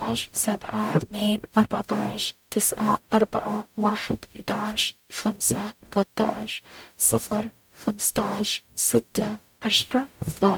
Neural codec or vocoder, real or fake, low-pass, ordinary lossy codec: codec, 44.1 kHz, 0.9 kbps, DAC; fake; none; none